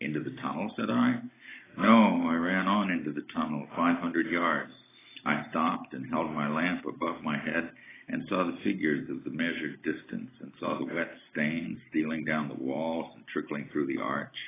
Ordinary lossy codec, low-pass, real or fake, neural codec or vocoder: AAC, 16 kbps; 3.6 kHz; real; none